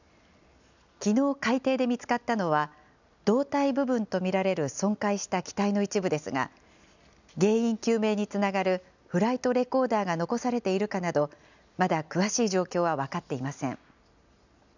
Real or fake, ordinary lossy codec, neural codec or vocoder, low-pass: real; none; none; 7.2 kHz